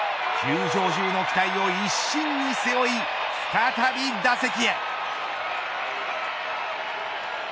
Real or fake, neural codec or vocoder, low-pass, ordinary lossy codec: real; none; none; none